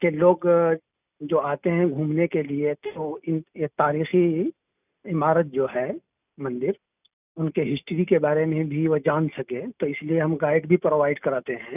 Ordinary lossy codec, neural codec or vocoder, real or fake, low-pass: none; none; real; 3.6 kHz